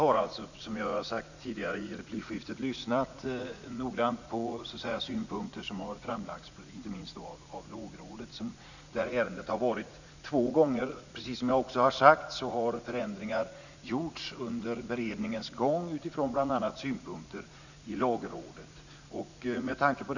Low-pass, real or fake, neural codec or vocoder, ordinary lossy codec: 7.2 kHz; fake; vocoder, 44.1 kHz, 80 mel bands, Vocos; none